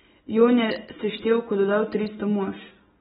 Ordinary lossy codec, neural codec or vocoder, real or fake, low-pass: AAC, 16 kbps; none; real; 19.8 kHz